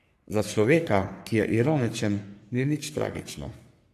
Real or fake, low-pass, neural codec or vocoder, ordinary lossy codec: fake; 14.4 kHz; codec, 44.1 kHz, 3.4 kbps, Pupu-Codec; AAC, 96 kbps